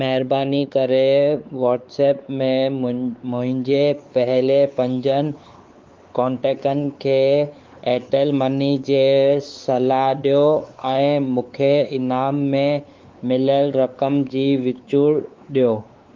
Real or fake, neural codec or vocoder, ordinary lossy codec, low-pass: fake; codec, 16 kHz, 4 kbps, X-Codec, WavLM features, trained on Multilingual LibriSpeech; Opus, 24 kbps; 7.2 kHz